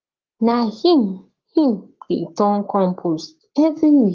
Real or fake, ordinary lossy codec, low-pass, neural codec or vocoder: fake; Opus, 32 kbps; 7.2 kHz; codec, 44.1 kHz, 7.8 kbps, Pupu-Codec